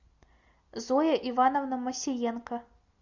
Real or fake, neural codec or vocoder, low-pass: real; none; 7.2 kHz